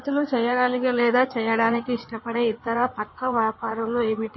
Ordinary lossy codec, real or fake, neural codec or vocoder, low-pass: MP3, 24 kbps; fake; codec, 16 kHz in and 24 kHz out, 2.2 kbps, FireRedTTS-2 codec; 7.2 kHz